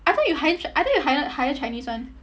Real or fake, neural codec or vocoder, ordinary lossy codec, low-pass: real; none; none; none